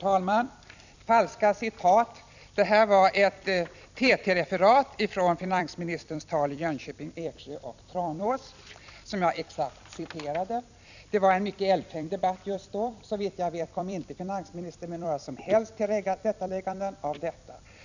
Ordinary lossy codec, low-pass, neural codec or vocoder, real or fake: none; 7.2 kHz; vocoder, 44.1 kHz, 128 mel bands every 256 samples, BigVGAN v2; fake